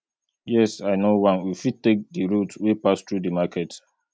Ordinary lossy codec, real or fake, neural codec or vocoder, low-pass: none; real; none; none